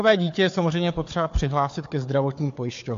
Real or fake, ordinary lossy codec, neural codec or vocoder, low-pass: fake; AAC, 48 kbps; codec, 16 kHz, 4 kbps, FunCodec, trained on Chinese and English, 50 frames a second; 7.2 kHz